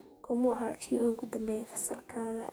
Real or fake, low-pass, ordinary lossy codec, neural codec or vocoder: fake; none; none; codec, 44.1 kHz, 2.6 kbps, DAC